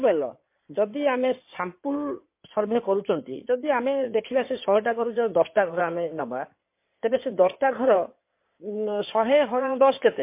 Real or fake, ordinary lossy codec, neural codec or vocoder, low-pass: fake; MP3, 24 kbps; codec, 16 kHz in and 24 kHz out, 2.2 kbps, FireRedTTS-2 codec; 3.6 kHz